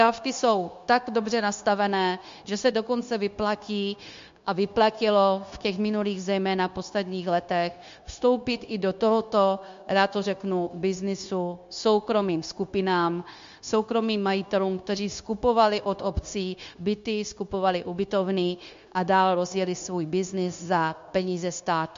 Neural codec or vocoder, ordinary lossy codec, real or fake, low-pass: codec, 16 kHz, 0.9 kbps, LongCat-Audio-Codec; MP3, 48 kbps; fake; 7.2 kHz